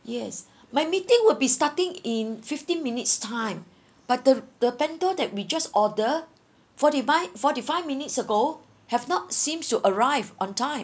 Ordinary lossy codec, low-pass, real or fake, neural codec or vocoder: none; none; real; none